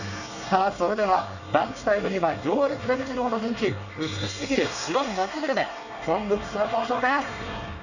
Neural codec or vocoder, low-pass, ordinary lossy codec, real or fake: codec, 24 kHz, 1 kbps, SNAC; 7.2 kHz; none; fake